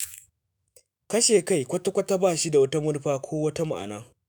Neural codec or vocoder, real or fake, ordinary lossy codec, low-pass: autoencoder, 48 kHz, 128 numbers a frame, DAC-VAE, trained on Japanese speech; fake; none; none